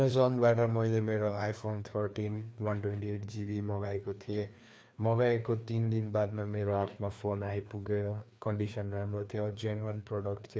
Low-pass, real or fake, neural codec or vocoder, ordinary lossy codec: none; fake; codec, 16 kHz, 2 kbps, FreqCodec, larger model; none